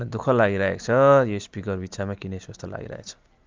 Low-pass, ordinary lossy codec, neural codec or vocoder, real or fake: 7.2 kHz; Opus, 24 kbps; none; real